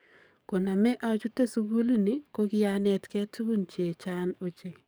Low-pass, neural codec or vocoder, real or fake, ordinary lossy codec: none; codec, 44.1 kHz, 7.8 kbps, DAC; fake; none